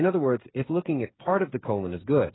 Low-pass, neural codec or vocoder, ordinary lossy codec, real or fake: 7.2 kHz; codec, 16 kHz, 8 kbps, FreqCodec, smaller model; AAC, 16 kbps; fake